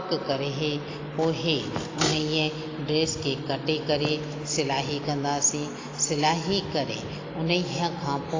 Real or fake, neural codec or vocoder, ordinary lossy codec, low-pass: real; none; AAC, 32 kbps; 7.2 kHz